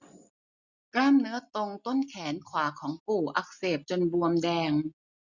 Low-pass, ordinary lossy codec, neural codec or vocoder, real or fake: 7.2 kHz; none; none; real